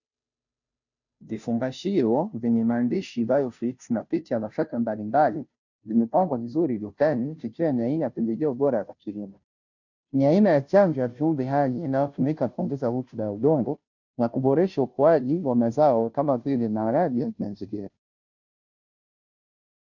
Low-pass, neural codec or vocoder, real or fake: 7.2 kHz; codec, 16 kHz, 0.5 kbps, FunCodec, trained on Chinese and English, 25 frames a second; fake